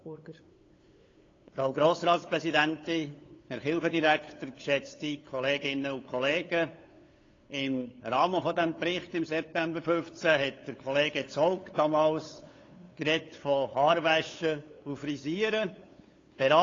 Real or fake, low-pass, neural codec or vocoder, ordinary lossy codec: fake; 7.2 kHz; codec, 16 kHz, 8 kbps, FunCodec, trained on LibriTTS, 25 frames a second; AAC, 32 kbps